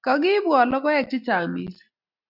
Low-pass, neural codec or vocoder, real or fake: 5.4 kHz; none; real